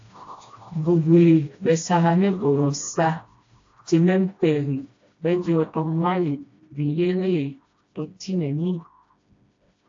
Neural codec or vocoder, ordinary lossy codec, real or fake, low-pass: codec, 16 kHz, 1 kbps, FreqCodec, smaller model; AAC, 48 kbps; fake; 7.2 kHz